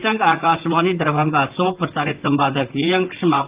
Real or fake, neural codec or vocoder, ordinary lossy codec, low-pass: fake; vocoder, 44.1 kHz, 128 mel bands, Pupu-Vocoder; Opus, 24 kbps; 3.6 kHz